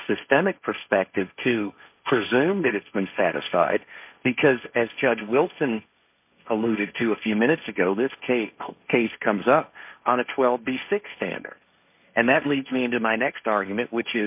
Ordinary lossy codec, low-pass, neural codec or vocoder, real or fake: MP3, 32 kbps; 3.6 kHz; codec, 16 kHz, 1.1 kbps, Voila-Tokenizer; fake